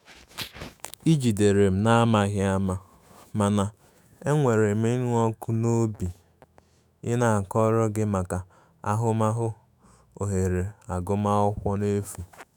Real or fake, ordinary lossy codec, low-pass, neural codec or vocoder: fake; none; none; autoencoder, 48 kHz, 128 numbers a frame, DAC-VAE, trained on Japanese speech